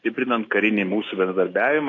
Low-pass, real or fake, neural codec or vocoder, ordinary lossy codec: 7.2 kHz; real; none; AAC, 32 kbps